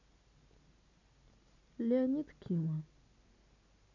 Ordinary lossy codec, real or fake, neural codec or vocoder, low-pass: none; real; none; 7.2 kHz